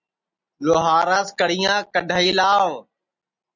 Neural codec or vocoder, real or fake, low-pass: none; real; 7.2 kHz